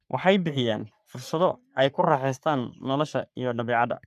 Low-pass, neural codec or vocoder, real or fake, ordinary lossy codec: 14.4 kHz; codec, 44.1 kHz, 3.4 kbps, Pupu-Codec; fake; none